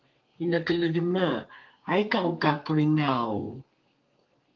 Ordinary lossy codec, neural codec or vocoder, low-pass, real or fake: Opus, 32 kbps; codec, 32 kHz, 1.9 kbps, SNAC; 7.2 kHz; fake